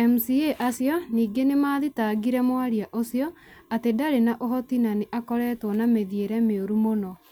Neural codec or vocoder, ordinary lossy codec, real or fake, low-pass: none; none; real; none